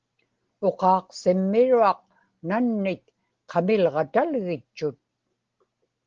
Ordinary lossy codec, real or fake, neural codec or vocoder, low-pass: Opus, 16 kbps; real; none; 7.2 kHz